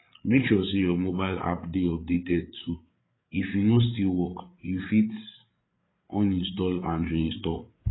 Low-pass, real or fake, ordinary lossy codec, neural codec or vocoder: 7.2 kHz; fake; AAC, 16 kbps; codec, 16 kHz, 8 kbps, FreqCodec, larger model